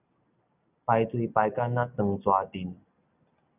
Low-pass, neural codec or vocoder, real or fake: 3.6 kHz; none; real